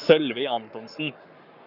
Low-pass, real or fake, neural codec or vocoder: 5.4 kHz; fake; codec, 44.1 kHz, 7.8 kbps, Pupu-Codec